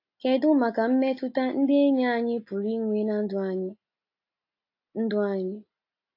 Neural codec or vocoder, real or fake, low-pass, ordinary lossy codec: none; real; 5.4 kHz; AAC, 32 kbps